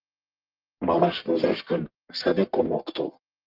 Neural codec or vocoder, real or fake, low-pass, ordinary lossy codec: codec, 44.1 kHz, 1.7 kbps, Pupu-Codec; fake; 5.4 kHz; Opus, 24 kbps